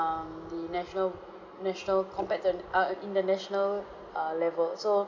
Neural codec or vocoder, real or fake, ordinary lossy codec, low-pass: none; real; MP3, 64 kbps; 7.2 kHz